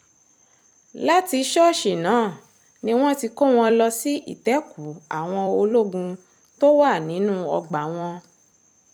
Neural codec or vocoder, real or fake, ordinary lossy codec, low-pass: vocoder, 44.1 kHz, 128 mel bands every 256 samples, BigVGAN v2; fake; none; 19.8 kHz